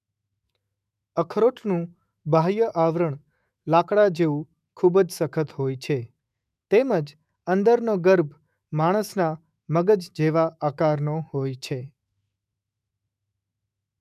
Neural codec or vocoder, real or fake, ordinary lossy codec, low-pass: autoencoder, 48 kHz, 128 numbers a frame, DAC-VAE, trained on Japanese speech; fake; none; 14.4 kHz